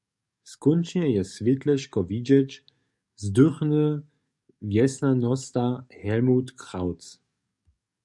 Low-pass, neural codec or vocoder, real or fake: 10.8 kHz; codec, 44.1 kHz, 7.8 kbps, DAC; fake